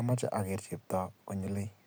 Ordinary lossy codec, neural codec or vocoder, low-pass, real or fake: none; none; none; real